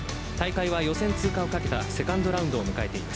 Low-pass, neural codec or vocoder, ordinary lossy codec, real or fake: none; none; none; real